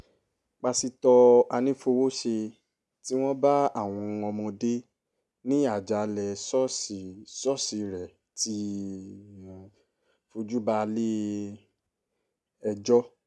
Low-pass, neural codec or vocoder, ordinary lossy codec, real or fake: none; none; none; real